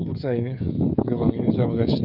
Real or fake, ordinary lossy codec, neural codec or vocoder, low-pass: fake; none; codec, 16 kHz, 8 kbps, FreqCodec, smaller model; 5.4 kHz